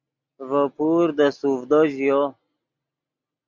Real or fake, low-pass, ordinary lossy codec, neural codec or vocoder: real; 7.2 kHz; MP3, 64 kbps; none